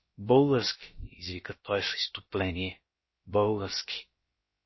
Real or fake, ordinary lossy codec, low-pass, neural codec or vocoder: fake; MP3, 24 kbps; 7.2 kHz; codec, 16 kHz, about 1 kbps, DyCAST, with the encoder's durations